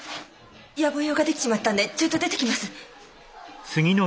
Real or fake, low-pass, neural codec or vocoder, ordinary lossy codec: real; none; none; none